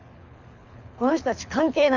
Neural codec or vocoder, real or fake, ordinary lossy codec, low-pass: codec, 24 kHz, 6 kbps, HILCodec; fake; none; 7.2 kHz